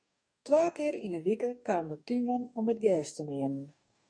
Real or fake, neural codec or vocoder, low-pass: fake; codec, 44.1 kHz, 2.6 kbps, DAC; 9.9 kHz